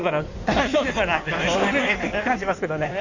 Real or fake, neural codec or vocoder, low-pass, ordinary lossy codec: fake; codec, 16 kHz in and 24 kHz out, 1.1 kbps, FireRedTTS-2 codec; 7.2 kHz; none